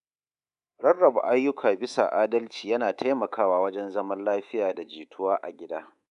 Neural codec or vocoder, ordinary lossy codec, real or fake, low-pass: codec, 24 kHz, 3.1 kbps, DualCodec; none; fake; 10.8 kHz